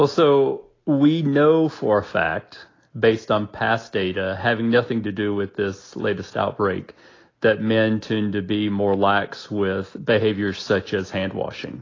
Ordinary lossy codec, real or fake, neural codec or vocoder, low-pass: AAC, 32 kbps; real; none; 7.2 kHz